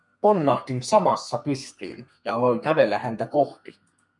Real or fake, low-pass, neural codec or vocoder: fake; 10.8 kHz; codec, 32 kHz, 1.9 kbps, SNAC